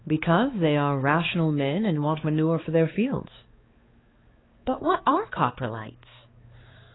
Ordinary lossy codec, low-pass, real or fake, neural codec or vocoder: AAC, 16 kbps; 7.2 kHz; fake; codec, 16 kHz, 4 kbps, X-Codec, HuBERT features, trained on balanced general audio